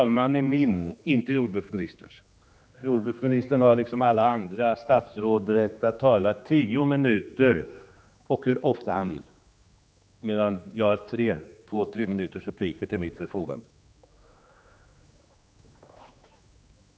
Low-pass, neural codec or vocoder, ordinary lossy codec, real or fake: none; codec, 16 kHz, 2 kbps, X-Codec, HuBERT features, trained on general audio; none; fake